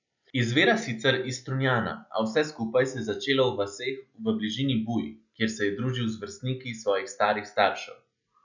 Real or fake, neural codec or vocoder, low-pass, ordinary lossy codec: real; none; 7.2 kHz; none